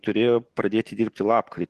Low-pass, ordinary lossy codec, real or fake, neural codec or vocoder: 14.4 kHz; Opus, 16 kbps; real; none